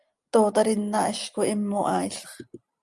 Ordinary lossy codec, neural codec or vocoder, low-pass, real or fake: Opus, 32 kbps; none; 10.8 kHz; real